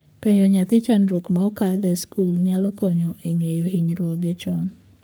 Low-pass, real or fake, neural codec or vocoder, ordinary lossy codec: none; fake; codec, 44.1 kHz, 3.4 kbps, Pupu-Codec; none